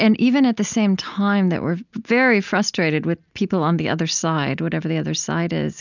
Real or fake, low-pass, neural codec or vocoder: real; 7.2 kHz; none